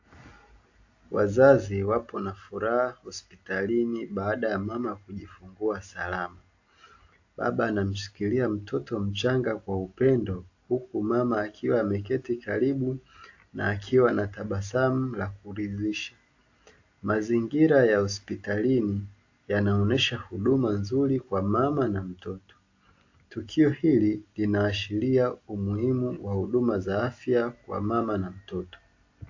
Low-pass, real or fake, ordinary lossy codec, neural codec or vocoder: 7.2 kHz; real; AAC, 48 kbps; none